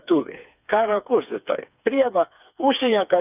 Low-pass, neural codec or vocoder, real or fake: 3.6 kHz; codec, 16 kHz, 4 kbps, FreqCodec, smaller model; fake